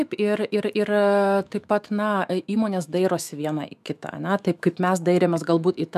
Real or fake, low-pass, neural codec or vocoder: fake; 14.4 kHz; autoencoder, 48 kHz, 128 numbers a frame, DAC-VAE, trained on Japanese speech